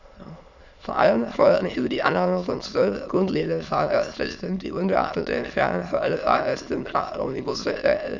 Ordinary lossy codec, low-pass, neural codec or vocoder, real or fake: none; 7.2 kHz; autoencoder, 22.05 kHz, a latent of 192 numbers a frame, VITS, trained on many speakers; fake